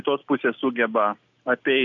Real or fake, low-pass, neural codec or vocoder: real; 7.2 kHz; none